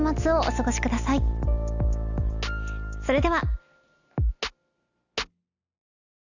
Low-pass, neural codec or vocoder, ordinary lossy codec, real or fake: 7.2 kHz; none; none; real